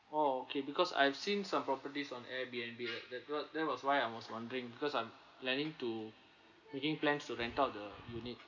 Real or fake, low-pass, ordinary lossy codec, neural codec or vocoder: real; 7.2 kHz; none; none